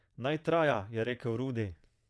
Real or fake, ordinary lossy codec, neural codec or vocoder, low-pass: fake; none; vocoder, 22.05 kHz, 80 mel bands, WaveNeXt; none